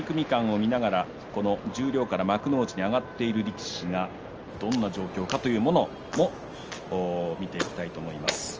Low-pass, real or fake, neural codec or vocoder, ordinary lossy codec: 7.2 kHz; real; none; Opus, 24 kbps